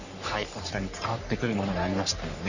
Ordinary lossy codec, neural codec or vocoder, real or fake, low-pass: none; codec, 44.1 kHz, 3.4 kbps, Pupu-Codec; fake; 7.2 kHz